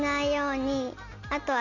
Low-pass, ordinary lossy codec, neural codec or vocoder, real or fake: 7.2 kHz; none; none; real